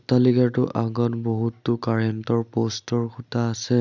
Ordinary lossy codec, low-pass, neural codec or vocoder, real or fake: none; 7.2 kHz; none; real